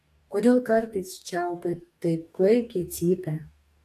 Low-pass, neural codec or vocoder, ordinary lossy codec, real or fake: 14.4 kHz; codec, 44.1 kHz, 2.6 kbps, DAC; AAC, 64 kbps; fake